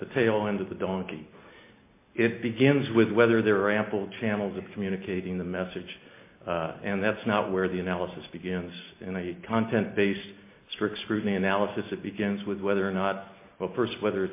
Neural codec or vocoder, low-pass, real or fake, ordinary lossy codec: none; 3.6 kHz; real; MP3, 24 kbps